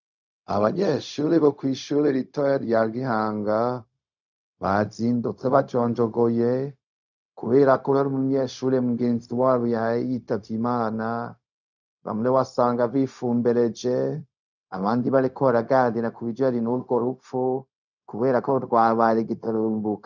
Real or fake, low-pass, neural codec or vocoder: fake; 7.2 kHz; codec, 16 kHz, 0.4 kbps, LongCat-Audio-Codec